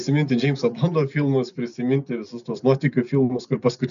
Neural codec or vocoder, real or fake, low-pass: none; real; 7.2 kHz